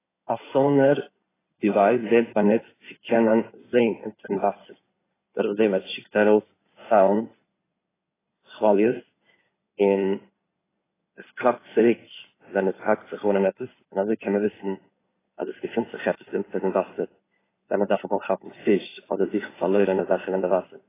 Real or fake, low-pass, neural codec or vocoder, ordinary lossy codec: fake; 3.6 kHz; codec, 16 kHz in and 24 kHz out, 2.2 kbps, FireRedTTS-2 codec; AAC, 16 kbps